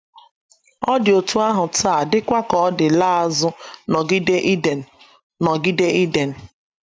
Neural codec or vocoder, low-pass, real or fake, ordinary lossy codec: none; none; real; none